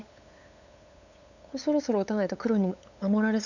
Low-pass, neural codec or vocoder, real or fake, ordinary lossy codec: 7.2 kHz; codec, 16 kHz, 8 kbps, FunCodec, trained on LibriTTS, 25 frames a second; fake; none